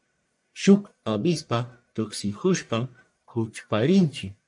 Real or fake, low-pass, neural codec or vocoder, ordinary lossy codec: fake; 10.8 kHz; codec, 44.1 kHz, 1.7 kbps, Pupu-Codec; MP3, 64 kbps